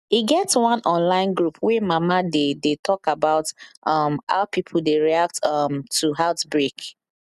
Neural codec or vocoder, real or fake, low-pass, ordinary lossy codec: none; real; 14.4 kHz; none